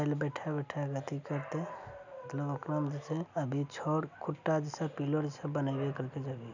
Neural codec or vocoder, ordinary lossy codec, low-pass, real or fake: none; none; 7.2 kHz; real